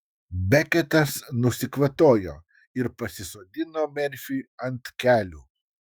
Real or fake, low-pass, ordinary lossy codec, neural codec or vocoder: fake; 19.8 kHz; Opus, 64 kbps; autoencoder, 48 kHz, 128 numbers a frame, DAC-VAE, trained on Japanese speech